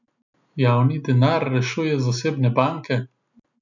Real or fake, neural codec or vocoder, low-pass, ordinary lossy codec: real; none; 7.2 kHz; none